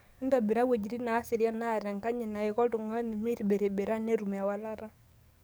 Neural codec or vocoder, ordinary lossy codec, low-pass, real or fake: codec, 44.1 kHz, 7.8 kbps, DAC; none; none; fake